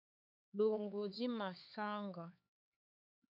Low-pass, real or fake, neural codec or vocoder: 5.4 kHz; fake; codec, 16 kHz, 4 kbps, X-Codec, HuBERT features, trained on LibriSpeech